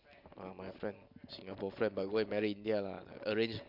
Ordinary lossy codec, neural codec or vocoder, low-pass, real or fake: MP3, 48 kbps; none; 5.4 kHz; real